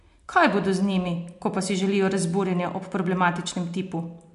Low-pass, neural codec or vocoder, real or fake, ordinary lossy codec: 10.8 kHz; none; real; MP3, 64 kbps